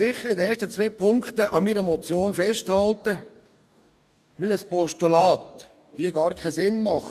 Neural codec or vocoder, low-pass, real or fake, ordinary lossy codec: codec, 44.1 kHz, 2.6 kbps, DAC; 14.4 kHz; fake; none